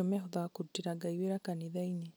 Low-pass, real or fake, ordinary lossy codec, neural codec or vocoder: none; real; none; none